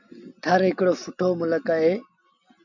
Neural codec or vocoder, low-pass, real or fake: none; 7.2 kHz; real